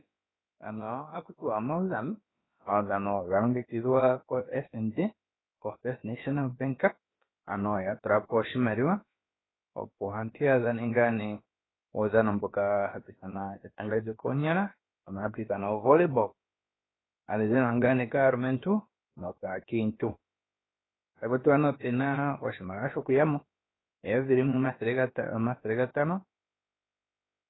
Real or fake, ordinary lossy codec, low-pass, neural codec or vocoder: fake; AAC, 16 kbps; 7.2 kHz; codec, 16 kHz, about 1 kbps, DyCAST, with the encoder's durations